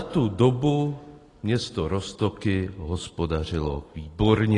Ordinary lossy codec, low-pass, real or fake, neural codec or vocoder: AAC, 32 kbps; 10.8 kHz; real; none